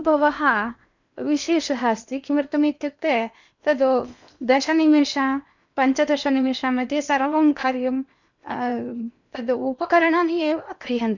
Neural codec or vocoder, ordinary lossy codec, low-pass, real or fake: codec, 16 kHz in and 24 kHz out, 0.8 kbps, FocalCodec, streaming, 65536 codes; none; 7.2 kHz; fake